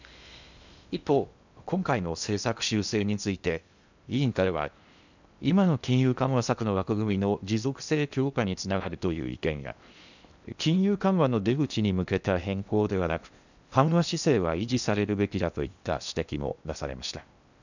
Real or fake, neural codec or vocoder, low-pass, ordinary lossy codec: fake; codec, 16 kHz in and 24 kHz out, 0.8 kbps, FocalCodec, streaming, 65536 codes; 7.2 kHz; none